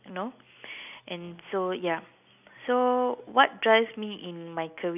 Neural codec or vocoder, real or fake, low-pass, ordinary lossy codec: none; real; 3.6 kHz; none